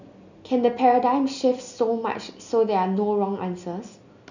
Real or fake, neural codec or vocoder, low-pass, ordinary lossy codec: real; none; 7.2 kHz; none